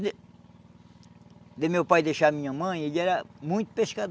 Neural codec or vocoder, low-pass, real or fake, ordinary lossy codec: none; none; real; none